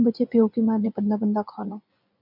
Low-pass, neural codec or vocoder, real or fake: 5.4 kHz; vocoder, 24 kHz, 100 mel bands, Vocos; fake